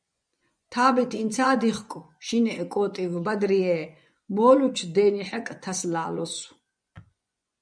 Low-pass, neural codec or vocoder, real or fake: 9.9 kHz; vocoder, 24 kHz, 100 mel bands, Vocos; fake